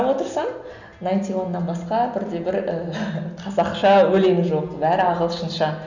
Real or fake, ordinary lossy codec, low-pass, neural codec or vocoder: real; none; 7.2 kHz; none